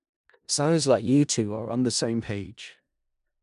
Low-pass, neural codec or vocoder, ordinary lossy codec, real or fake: 10.8 kHz; codec, 16 kHz in and 24 kHz out, 0.4 kbps, LongCat-Audio-Codec, four codebook decoder; none; fake